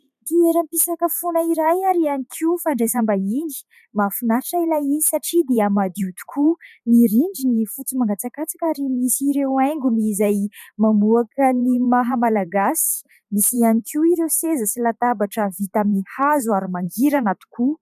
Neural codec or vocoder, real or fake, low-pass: vocoder, 44.1 kHz, 128 mel bands every 512 samples, BigVGAN v2; fake; 14.4 kHz